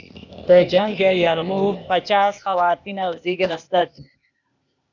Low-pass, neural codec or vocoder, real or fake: 7.2 kHz; codec, 16 kHz, 0.8 kbps, ZipCodec; fake